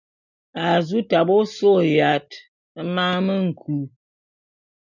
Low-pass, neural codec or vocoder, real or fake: 7.2 kHz; none; real